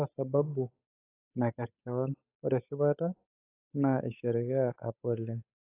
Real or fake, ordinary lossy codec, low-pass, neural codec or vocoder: fake; AAC, 24 kbps; 3.6 kHz; codec, 16 kHz, 8 kbps, FunCodec, trained on Chinese and English, 25 frames a second